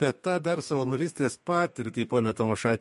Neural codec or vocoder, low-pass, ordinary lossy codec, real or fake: codec, 32 kHz, 1.9 kbps, SNAC; 14.4 kHz; MP3, 48 kbps; fake